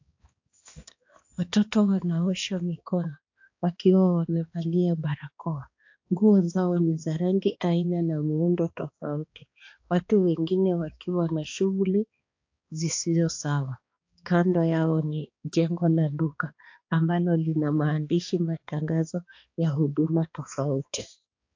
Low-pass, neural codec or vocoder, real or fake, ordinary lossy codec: 7.2 kHz; codec, 16 kHz, 2 kbps, X-Codec, HuBERT features, trained on balanced general audio; fake; AAC, 48 kbps